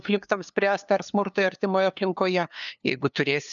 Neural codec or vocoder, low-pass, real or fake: codec, 16 kHz, 2 kbps, FunCodec, trained on LibriTTS, 25 frames a second; 7.2 kHz; fake